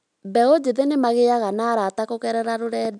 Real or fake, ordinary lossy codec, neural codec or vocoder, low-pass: real; MP3, 96 kbps; none; 9.9 kHz